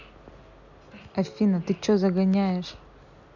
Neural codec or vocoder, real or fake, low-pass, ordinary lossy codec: none; real; 7.2 kHz; none